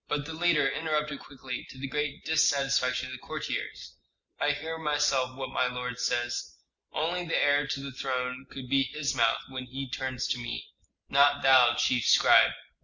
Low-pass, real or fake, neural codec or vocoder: 7.2 kHz; real; none